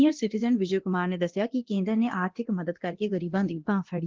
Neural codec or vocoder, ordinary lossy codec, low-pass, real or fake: codec, 24 kHz, 0.9 kbps, DualCodec; Opus, 16 kbps; 7.2 kHz; fake